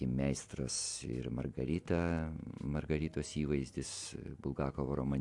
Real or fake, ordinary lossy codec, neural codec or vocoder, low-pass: real; AAC, 64 kbps; none; 10.8 kHz